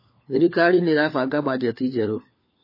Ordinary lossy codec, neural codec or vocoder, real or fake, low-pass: MP3, 24 kbps; codec, 16 kHz, 4 kbps, FunCodec, trained on LibriTTS, 50 frames a second; fake; 5.4 kHz